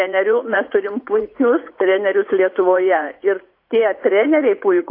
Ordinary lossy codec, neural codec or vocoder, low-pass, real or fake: AAC, 32 kbps; vocoder, 44.1 kHz, 80 mel bands, Vocos; 5.4 kHz; fake